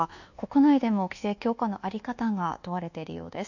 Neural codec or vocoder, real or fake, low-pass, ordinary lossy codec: codec, 24 kHz, 1.2 kbps, DualCodec; fake; 7.2 kHz; none